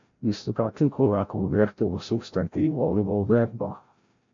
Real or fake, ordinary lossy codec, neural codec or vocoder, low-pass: fake; MP3, 48 kbps; codec, 16 kHz, 0.5 kbps, FreqCodec, larger model; 7.2 kHz